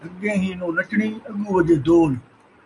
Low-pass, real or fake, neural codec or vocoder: 10.8 kHz; real; none